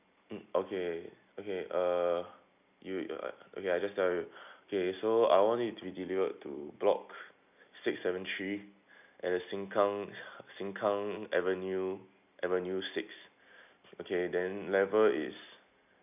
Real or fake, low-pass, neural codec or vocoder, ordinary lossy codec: real; 3.6 kHz; none; none